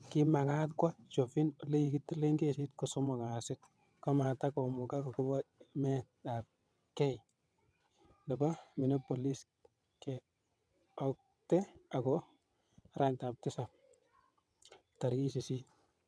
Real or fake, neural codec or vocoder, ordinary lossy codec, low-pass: fake; vocoder, 22.05 kHz, 80 mel bands, WaveNeXt; none; none